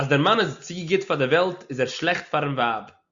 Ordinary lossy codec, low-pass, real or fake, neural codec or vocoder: Opus, 64 kbps; 7.2 kHz; real; none